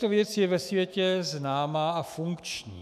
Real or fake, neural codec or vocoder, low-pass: fake; autoencoder, 48 kHz, 128 numbers a frame, DAC-VAE, trained on Japanese speech; 14.4 kHz